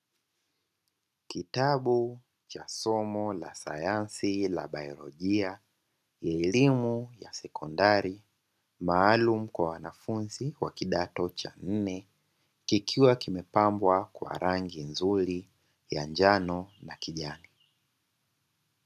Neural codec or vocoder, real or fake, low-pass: none; real; 14.4 kHz